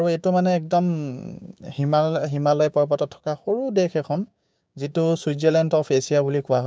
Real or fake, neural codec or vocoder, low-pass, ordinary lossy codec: fake; codec, 16 kHz, 6 kbps, DAC; none; none